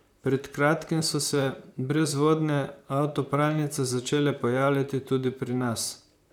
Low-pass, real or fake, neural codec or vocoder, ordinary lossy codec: 19.8 kHz; fake; vocoder, 44.1 kHz, 128 mel bands, Pupu-Vocoder; none